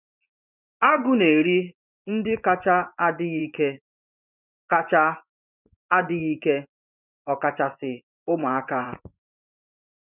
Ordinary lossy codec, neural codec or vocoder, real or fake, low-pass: none; vocoder, 24 kHz, 100 mel bands, Vocos; fake; 3.6 kHz